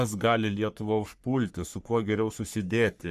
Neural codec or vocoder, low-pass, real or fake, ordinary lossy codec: codec, 44.1 kHz, 3.4 kbps, Pupu-Codec; 14.4 kHz; fake; Opus, 64 kbps